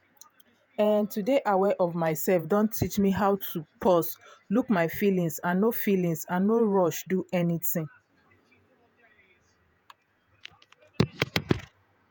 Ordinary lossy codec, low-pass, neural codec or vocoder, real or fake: none; none; vocoder, 48 kHz, 128 mel bands, Vocos; fake